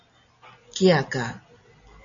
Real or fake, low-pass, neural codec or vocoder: real; 7.2 kHz; none